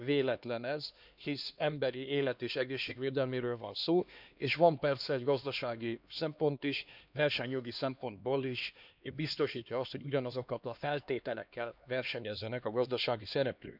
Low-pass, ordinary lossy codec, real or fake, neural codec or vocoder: 5.4 kHz; none; fake; codec, 16 kHz, 2 kbps, X-Codec, HuBERT features, trained on LibriSpeech